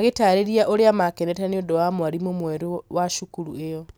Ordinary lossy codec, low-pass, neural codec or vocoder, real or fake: none; none; none; real